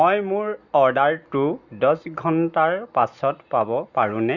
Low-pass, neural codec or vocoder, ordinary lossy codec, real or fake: 7.2 kHz; none; Opus, 64 kbps; real